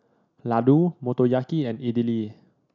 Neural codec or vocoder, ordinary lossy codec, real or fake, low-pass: none; none; real; 7.2 kHz